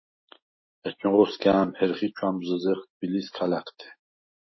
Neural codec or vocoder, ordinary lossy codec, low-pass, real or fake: none; MP3, 24 kbps; 7.2 kHz; real